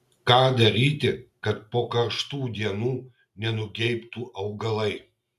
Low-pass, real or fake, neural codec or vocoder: 14.4 kHz; real; none